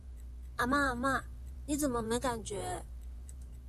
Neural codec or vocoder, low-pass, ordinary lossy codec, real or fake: vocoder, 44.1 kHz, 128 mel bands, Pupu-Vocoder; 14.4 kHz; AAC, 96 kbps; fake